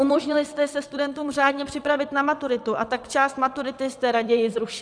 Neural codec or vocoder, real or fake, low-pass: vocoder, 22.05 kHz, 80 mel bands, WaveNeXt; fake; 9.9 kHz